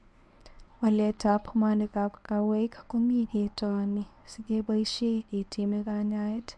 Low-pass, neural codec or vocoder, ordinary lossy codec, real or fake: none; codec, 24 kHz, 0.9 kbps, WavTokenizer, medium speech release version 1; none; fake